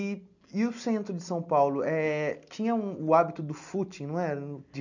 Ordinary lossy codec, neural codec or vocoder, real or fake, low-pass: none; none; real; 7.2 kHz